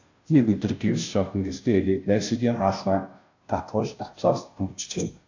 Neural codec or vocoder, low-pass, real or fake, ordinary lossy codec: codec, 16 kHz, 0.5 kbps, FunCodec, trained on Chinese and English, 25 frames a second; 7.2 kHz; fake; AAC, 48 kbps